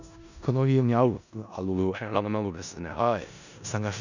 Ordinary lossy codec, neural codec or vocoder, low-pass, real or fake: none; codec, 16 kHz in and 24 kHz out, 0.4 kbps, LongCat-Audio-Codec, four codebook decoder; 7.2 kHz; fake